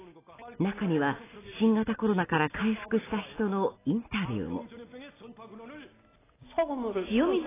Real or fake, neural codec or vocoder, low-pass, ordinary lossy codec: real; none; 3.6 kHz; AAC, 16 kbps